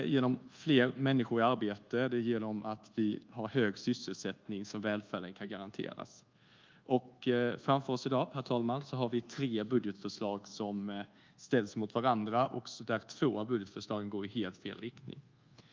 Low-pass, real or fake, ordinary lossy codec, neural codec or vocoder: 7.2 kHz; fake; Opus, 24 kbps; codec, 24 kHz, 1.2 kbps, DualCodec